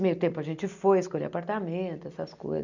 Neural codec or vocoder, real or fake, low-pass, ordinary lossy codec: none; real; 7.2 kHz; none